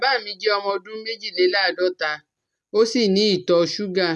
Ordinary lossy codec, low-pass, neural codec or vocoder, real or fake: none; none; none; real